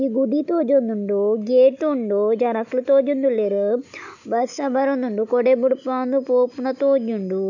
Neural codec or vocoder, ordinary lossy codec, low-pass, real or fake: none; none; 7.2 kHz; real